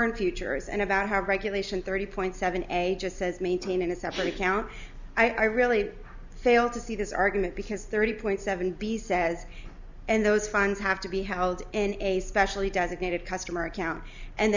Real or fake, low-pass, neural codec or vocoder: real; 7.2 kHz; none